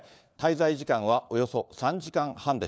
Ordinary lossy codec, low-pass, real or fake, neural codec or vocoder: none; none; fake; codec, 16 kHz, 4 kbps, FunCodec, trained on LibriTTS, 50 frames a second